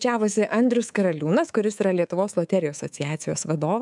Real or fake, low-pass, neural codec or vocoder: fake; 10.8 kHz; codec, 24 kHz, 3.1 kbps, DualCodec